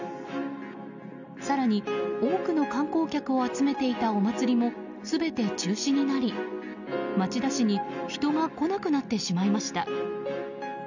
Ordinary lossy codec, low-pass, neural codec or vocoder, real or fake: none; 7.2 kHz; none; real